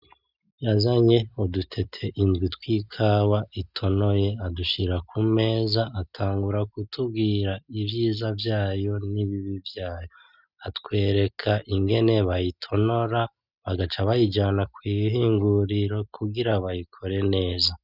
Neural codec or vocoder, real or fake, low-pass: none; real; 5.4 kHz